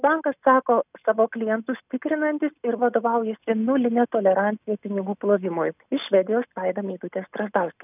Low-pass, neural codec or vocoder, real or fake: 3.6 kHz; none; real